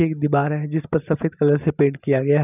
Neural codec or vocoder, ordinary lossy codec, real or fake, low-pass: none; none; real; 3.6 kHz